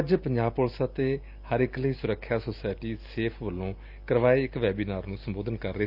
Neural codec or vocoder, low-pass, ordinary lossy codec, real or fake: none; 5.4 kHz; Opus, 24 kbps; real